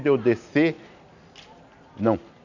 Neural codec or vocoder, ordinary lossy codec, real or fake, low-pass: none; none; real; 7.2 kHz